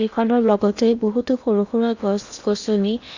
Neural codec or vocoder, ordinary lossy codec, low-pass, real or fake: codec, 16 kHz in and 24 kHz out, 0.8 kbps, FocalCodec, streaming, 65536 codes; none; 7.2 kHz; fake